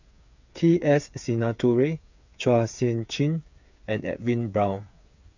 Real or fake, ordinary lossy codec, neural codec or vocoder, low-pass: fake; none; codec, 16 kHz, 8 kbps, FreqCodec, smaller model; 7.2 kHz